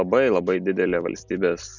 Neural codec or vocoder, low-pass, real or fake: none; 7.2 kHz; real